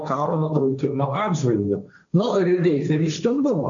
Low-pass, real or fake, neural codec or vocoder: 7.2 kHz; fake; codec, 16 kHz, 1.1 kbps, Voila-Tokenizer